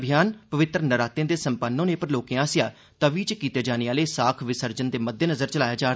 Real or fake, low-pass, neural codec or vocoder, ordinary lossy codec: real; none; none; none